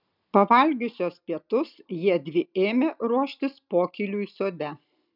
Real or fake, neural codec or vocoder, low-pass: real; none; 5.4 kHz